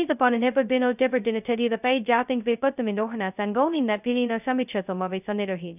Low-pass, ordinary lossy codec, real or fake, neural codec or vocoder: 3.6 kHz; none; fake; codec, 16 kHz, 0.2 kbps, FocalCodec